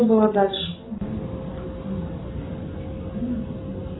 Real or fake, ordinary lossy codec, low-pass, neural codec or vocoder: real; AAC, 16 kbps; 7.2 kHz; none